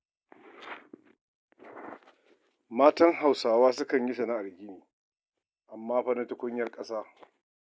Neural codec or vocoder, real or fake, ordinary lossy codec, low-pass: none; real; none; none